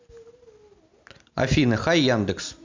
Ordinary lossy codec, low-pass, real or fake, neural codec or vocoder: MP3, 64 kbps; 7.2 kHz; real; none